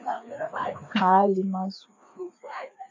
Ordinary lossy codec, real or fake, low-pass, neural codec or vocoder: AAC, 48 kbps; fake; 7.2 kHz; codec, 16 kHz, 2 kbps, FreqCodec, larger model